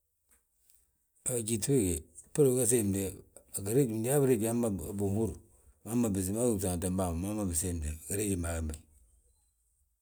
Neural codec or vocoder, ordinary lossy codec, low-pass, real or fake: none; none; none; real